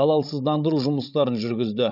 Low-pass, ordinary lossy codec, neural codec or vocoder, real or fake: 5.4 kHz; none; codec, 16 kHz, 16 kbps, FreqCodec, larger model; fake